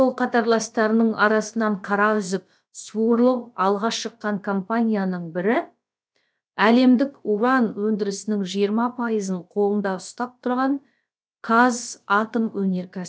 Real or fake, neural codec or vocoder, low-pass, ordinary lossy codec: fake; codec, 16 kHz, about 1 kbps, DyCAST, with the encoder's durations; none; none